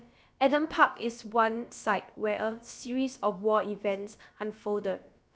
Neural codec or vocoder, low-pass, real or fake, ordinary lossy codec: codec, 16 kHz, about 1 kbps, DyCAST, with the encoder's durations; none; fake; none